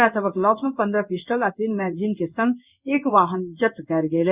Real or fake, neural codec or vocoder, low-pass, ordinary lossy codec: fake; codec, 16 kHz in and 24 kHz out, 1 kbps, XY-Tokenizer; 3.6 kHz; Opus, 64 kbps